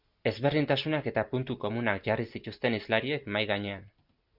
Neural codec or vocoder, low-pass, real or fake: none; 5.4 kHz; real